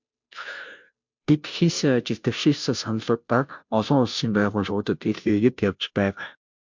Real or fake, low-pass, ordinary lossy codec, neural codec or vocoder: fake; 7.2 kHz; MP3, 64 kbps; codec, 16 kHz, 0.5 kbps, FunCodec, trained on Chinese and English, 25 frames a second